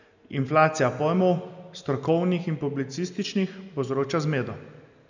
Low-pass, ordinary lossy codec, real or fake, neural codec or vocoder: 7.2 kHz; none; real; none